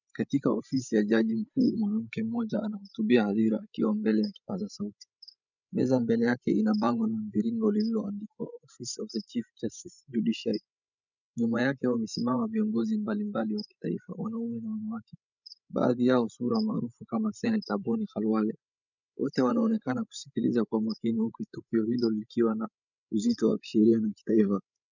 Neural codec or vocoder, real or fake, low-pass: codec, 16 kHz, 16 kbps, FreqCodec, larger model; fake; 7.2 kHz